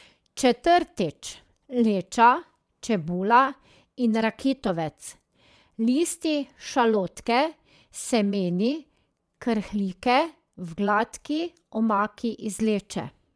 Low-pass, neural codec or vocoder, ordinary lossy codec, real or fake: none; vocoder, 22.05 kHz, 80 mel bands, Vocos; none; fake